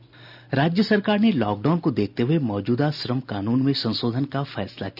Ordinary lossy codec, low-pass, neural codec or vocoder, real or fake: none; 5.4 kHz; none; real